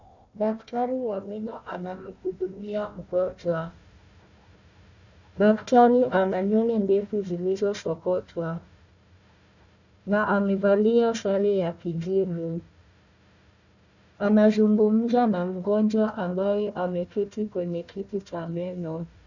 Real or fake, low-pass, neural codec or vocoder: fake; 7.2 kHz; codec, 16 kHz, 1 kbps, FunCodec, trained on Chinese and English, 50 frames a second